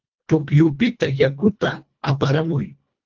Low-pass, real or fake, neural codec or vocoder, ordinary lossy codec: 7.2 kHz; fake; codec, 24 kHz, 1.5 kbps, HILCodec; Opus, 24 kbps